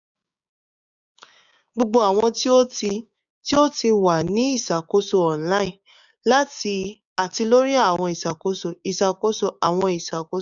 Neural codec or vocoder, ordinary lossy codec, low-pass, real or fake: codec, 16 kHz, 6 kbps, DAC; none; 7.2 kHz; fake